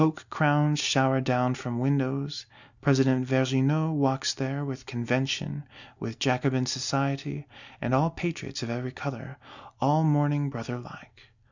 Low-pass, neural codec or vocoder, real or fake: 7.2 kHz; none; real